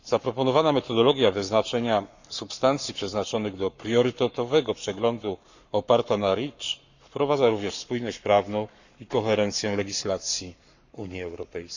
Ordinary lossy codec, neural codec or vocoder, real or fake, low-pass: none; codec, 44.1 kHz, 7.8 kbps, Pupu-Codec; fake; 7.2 kHz